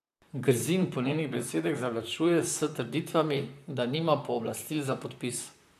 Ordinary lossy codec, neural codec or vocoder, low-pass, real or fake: none; vocoder, 44.1 kHz, 128 mel bands, Pupu-Vocoder; 14.4 kHz; fake